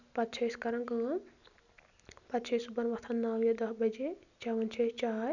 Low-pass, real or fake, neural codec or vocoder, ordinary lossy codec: 7.2 kHz; real; none; none